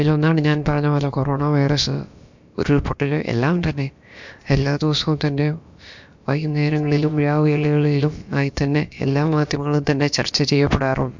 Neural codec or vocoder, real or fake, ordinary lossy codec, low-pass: codec, 16 kHz, about 1 kbps, DyCAST, with the encoder's durations; fake; MP3, 64 kbps; 7.2 kHz